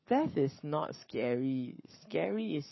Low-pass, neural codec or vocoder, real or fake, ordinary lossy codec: 7.2 kHz; codec, 44.1 kHz, 7.8 kbps, Pupu-Codec; fake; MP3, 24 kbps